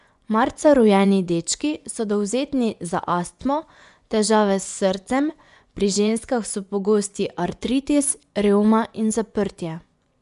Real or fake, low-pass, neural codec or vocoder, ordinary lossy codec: fake; 10.8 kHz; vocoder, 24 kHz, 100 mel bands, Vocos; none